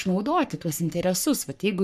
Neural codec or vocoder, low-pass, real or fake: codec, 44.1 kHz, 3.4 kbps, Pupu-Codec; 14.4 kHz; fake